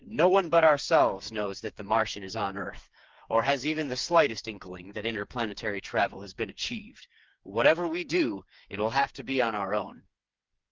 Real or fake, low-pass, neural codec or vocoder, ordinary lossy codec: fake; 7.2 kHz; codec, 16 kHz, 4 kbps, FreqCodec, smaller model; Opus, 32 kbps